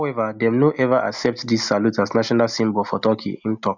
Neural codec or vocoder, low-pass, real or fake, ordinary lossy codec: none; 7.2 kHz; real; none